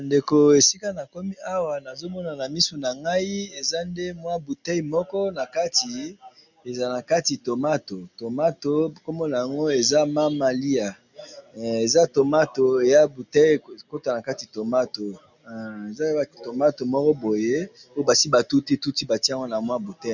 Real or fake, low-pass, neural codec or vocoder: real; 7.2 kHz; none